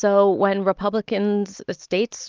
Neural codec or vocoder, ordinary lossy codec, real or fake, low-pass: none; Opus, 24 kbps; real; 7.2 kHz